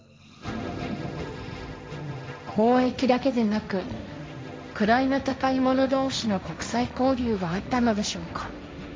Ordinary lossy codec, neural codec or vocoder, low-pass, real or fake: none; codec, 16 kHz, 1.1 kbps, Voila-Tokenizer; none; fake